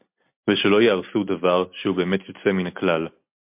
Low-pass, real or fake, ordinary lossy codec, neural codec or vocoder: 3.6 kHz; real; MP3, 32 kbps; none